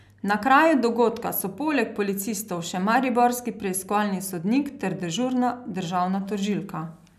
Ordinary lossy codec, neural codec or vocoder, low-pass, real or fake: none; none; 14.4 kHz; real